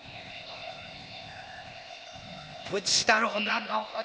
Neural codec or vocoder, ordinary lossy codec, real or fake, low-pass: codec, 16 kHz, 0.8 kbps, ZipCodec; none; fake; none